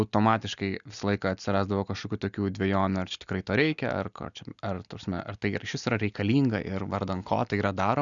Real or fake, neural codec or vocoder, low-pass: real; none; 7.2 kHz